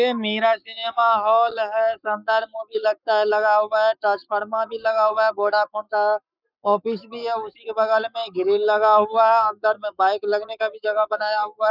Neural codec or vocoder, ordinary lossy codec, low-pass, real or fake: codec, 44.1 kHz, 7.8 kbps, Pupu-Codec; none; 5.4 kHz; fake